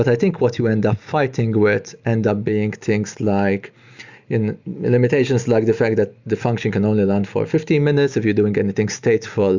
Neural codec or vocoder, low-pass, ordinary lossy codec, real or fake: none; 7.2 kHz; Opus, 64 kbps; real